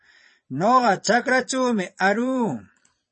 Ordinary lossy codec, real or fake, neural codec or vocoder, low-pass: MP3, 32 kbps; fake; vocoder, 22.05 kHz, 80 mel bands, Vocos; 9.9 kHz